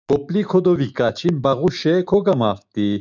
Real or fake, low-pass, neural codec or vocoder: fake; 7.2 kHz; autoencoder, 48 kHz, 128 numbers a frame, DAC-VAE, trained on Japanese speech